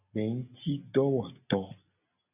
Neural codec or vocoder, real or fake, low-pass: none; real; 3.6 kHz